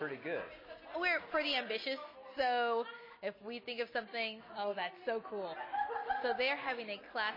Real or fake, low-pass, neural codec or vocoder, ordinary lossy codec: real; 5.4 kHz; none; MP3, 24 kbps